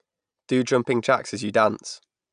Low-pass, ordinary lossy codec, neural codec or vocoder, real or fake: 9.9 kHz; none; none; real